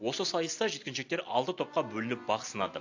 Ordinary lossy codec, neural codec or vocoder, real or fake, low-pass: AAC, 48 kbps; none; real; 7.2 kHz